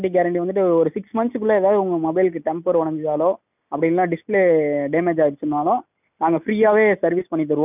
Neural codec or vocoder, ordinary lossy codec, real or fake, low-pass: none; none; real; 3.6 kHz